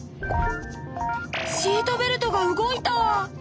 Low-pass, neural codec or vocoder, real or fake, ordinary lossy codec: none; none; real; none